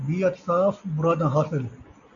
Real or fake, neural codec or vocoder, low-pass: real; none; 7.2 kHz